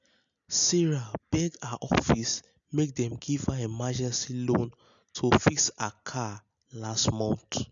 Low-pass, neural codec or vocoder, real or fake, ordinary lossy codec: 7.2 kHz; none; real; none